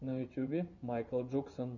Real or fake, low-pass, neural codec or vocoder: fake; 7.2 kHz; vocoder, 24 kHz, 100 mel bands, Vocos